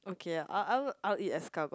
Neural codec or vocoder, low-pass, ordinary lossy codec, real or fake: none; none; none; real